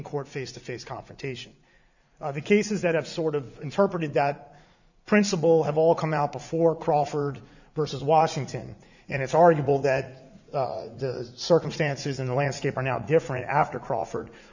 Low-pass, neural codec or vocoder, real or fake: 7.2 kHz; vocoder, 44.1 kHz, 80 mel bands, Vocos; fake